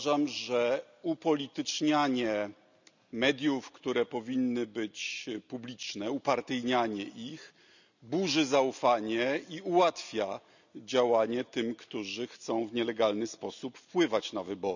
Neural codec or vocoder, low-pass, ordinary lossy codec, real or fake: none; 7.2 kHz; none; real